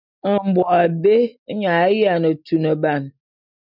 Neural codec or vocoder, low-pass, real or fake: none; 5.4 kHz; real